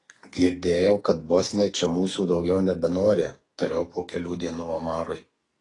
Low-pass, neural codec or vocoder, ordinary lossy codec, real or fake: 10.8 kHz; codec, 44.1 kHz, 2.6 kbps, SNAC; AAC, 32 kbps; fake